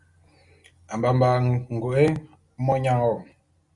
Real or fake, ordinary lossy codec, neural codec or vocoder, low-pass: real; Opus, 64 kbps; none; 10.8 kHz